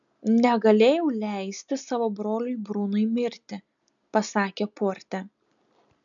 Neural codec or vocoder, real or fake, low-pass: none; real; 7.2 kHz